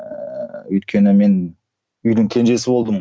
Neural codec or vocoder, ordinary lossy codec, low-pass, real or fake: none; none; none; real